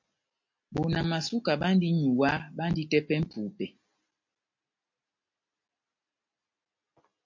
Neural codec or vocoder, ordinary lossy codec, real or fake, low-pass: none; MP3, 48 kbps; real; 7.2 kHz